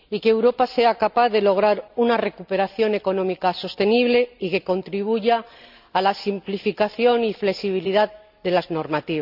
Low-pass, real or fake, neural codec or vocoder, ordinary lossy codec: 5.4 kHz; real; none; none